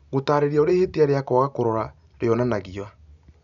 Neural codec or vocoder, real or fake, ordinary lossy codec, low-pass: none; real; none; 7.2 kHz